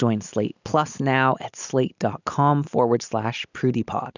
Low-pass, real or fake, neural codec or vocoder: 7.2 kHz; real; none